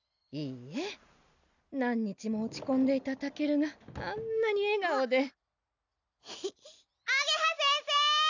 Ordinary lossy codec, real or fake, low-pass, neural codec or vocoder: none; real; 7.2 kHz; none